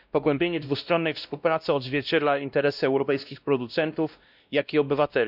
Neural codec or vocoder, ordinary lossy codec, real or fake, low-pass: codec, 16 kHz, 1 kbps, X-Codec, WavLM features, trained on Multilingual LibriSpeech; none; fake; 5.4 kHz